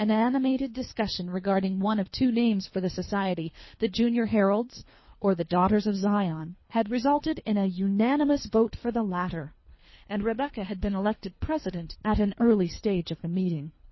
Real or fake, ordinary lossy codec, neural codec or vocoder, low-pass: fake; MP3, 24 kbps; codec, 24 kHz, 3 kbps, HILCodec; 7.2 kHz